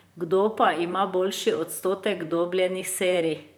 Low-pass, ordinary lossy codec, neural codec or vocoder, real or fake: none; none; vocoder, 44.1 kHz, 128 mel bands, Pupu-Vocoder; fake